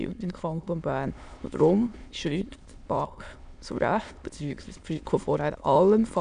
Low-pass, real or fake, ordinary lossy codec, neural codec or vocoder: 9.9 kHz; fake; none; autoencoder, 22.05 kHz, a latent of 192 numbers a frame, VITS, trained on many speakers